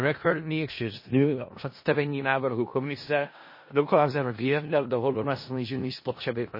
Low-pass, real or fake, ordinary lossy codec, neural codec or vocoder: 5.4 kHz; fake; MP3, 24 kbps; codec, 16 kHz in and 24 kHz out, 0.4 kbps, LongCat-Audio-Codec, four codebook decoder